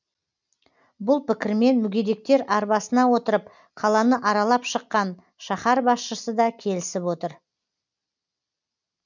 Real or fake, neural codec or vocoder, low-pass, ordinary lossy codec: real; none; 7.2 kHz; none